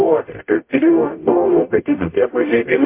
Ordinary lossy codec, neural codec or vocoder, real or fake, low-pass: MP3, 32 kbps; codec, 44.1 kHz, 0.9 kbps, DAC; fake; 3.6 kHz